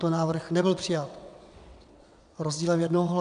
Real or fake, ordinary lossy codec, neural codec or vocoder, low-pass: fake; MP3, 96 kbps; vocoder, 22.05 kHz, 80 mel bands, WaveNeXt; 9.9 kHz